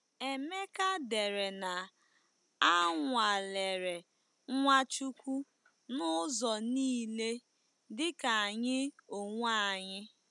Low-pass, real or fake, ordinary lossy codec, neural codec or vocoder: 10.8 kHz; real; none; none